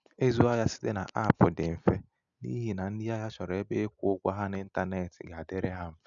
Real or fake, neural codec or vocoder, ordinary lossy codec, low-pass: real; none; none; 7.2 kHz